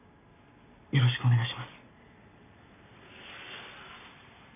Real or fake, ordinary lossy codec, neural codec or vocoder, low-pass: real; none; none; 3.6 kHz